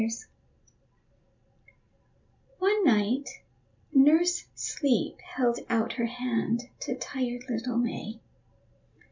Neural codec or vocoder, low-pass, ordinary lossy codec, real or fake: none; 7.2 kHz; MP3, 64 kbps; real